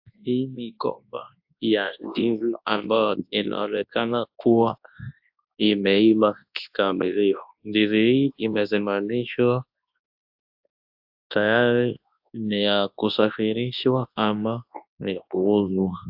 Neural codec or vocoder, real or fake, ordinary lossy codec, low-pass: codec, 24 kHz, 0.9 kbps, WavTokenizer, large speech release; fake; AAC, 48 kbps; 5.4 kHz